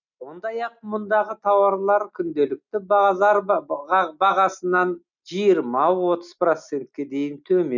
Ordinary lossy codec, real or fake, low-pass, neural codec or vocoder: none; real; 7.2 kHz; none